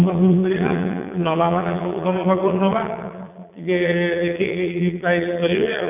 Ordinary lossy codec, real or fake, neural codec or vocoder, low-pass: none; fake; vocoder, 22.05 kHz, 80 mel bands, Vocos; 3.6 kHz